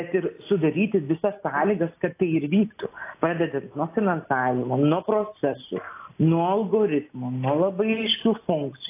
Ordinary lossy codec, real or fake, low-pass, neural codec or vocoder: AAC, 24 kbps; real; 3.6 kHz; none